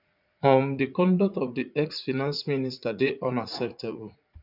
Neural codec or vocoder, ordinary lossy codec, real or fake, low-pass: vocoder, 44.1 kHz, 80 mel bands, Vocos; none; fake; 5.4 kHz